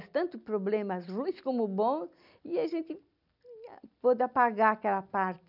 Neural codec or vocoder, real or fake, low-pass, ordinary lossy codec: none; real; 5.4 kHz; none